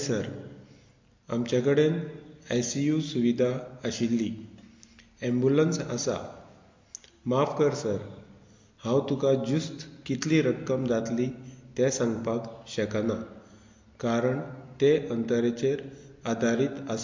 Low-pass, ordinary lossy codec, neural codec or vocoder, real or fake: 7.2 kHz; MP3, 48 kbps; none; real